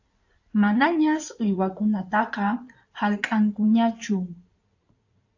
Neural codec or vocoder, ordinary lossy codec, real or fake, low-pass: codec, 16 kHz in and 24 kHz out, 2.2 kbps, FireRedTTS-2 codec; AAC, 48 kbps; fake; 7.2 kHz